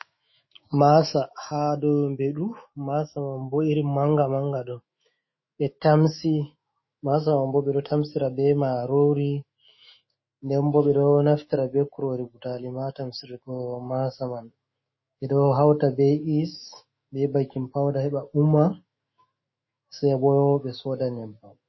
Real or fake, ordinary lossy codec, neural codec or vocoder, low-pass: fake; MP3, 24 kbps; autoencoder, 48 kHz, 128 numbers a frame, DAC-VAE, trained on Japanese speech; 7.2 kHz